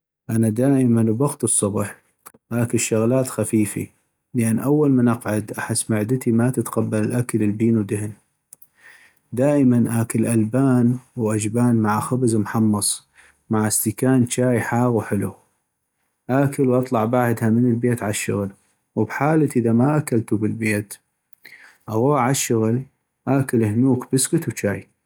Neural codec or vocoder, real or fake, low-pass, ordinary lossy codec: none; real; none; none